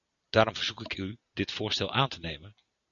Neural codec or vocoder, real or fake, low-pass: none; real; 7.2 kHz